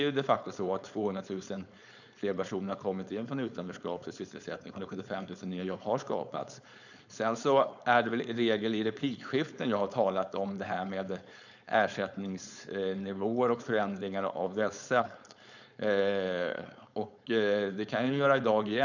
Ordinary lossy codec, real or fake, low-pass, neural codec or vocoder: none; fake; 7.2 kHz; codec, 16 kHz, 4.8 kbps, FACodec